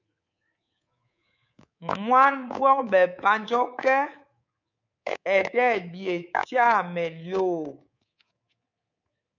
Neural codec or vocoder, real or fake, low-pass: codec, 24 kHz, 3.1 kbps, DualCodec; fake; 7.2 kHz